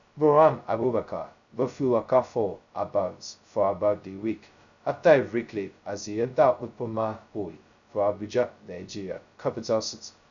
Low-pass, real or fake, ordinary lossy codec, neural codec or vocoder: 7.2 kHz; fake; none; codec, 16 kHz, 0.2 kbps, FocalCodec